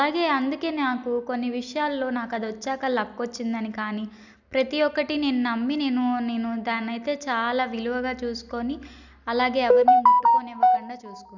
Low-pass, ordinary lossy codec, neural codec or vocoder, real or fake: 7.2 kHz; none; none; real